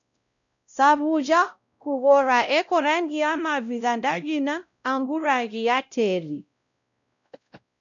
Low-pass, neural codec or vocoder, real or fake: 7.2 kHz; codec, 16 kHz, 0.5 kbps, X-Codec, WavLM features, trained on Multilingual LibriSpeech; fake